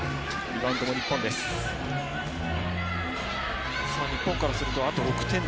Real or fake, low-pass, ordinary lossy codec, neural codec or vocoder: real; none; none; none